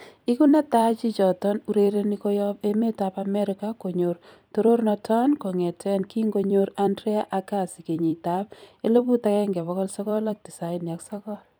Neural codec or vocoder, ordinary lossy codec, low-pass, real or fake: vocoder, 44.1 kHz, 128 mel bands every 256 samples, BigVGAN v2; none; none; fake